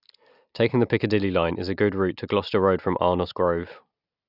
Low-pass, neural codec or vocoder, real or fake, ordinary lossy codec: 5.4 kHz; none; real; none